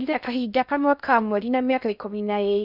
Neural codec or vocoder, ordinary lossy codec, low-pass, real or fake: codec, 16 kHz in and 24 kHz out, 0.6 kbps, FocalCodec, streaming, 2048 codes; none; 5.4 kHz; fake